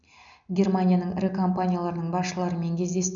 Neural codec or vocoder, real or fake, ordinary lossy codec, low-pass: none; real; none; 7.2 kHz